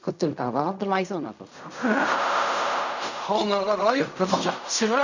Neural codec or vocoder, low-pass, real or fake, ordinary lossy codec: codec, 16 kHz in and 24 kHz out, 0.4 kbps, LongCat-Audio-Codec, fine tuned four codebook decoder; 7.2 kHz; fake; none